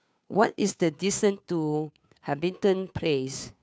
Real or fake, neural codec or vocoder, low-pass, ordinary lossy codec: fake; codec, 16 kHz, 2 kbps, FunCodec, trained on Chinese and English, 25 frames a second; none; none